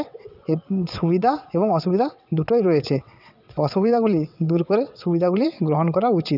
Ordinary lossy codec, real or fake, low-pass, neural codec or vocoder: none; fake; 5.4 kHz; vocoder, 22.05 kHz, 80 mel bands, WaveNeXt